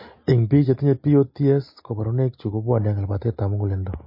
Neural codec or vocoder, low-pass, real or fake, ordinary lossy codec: none; 5.4 kHz; real; MP3, 24 kbps